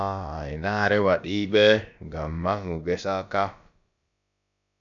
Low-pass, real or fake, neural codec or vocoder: 7.2 kHz; fake; codec, 16 kHz, about 1 kbps, DyCAST, with the encoder's durations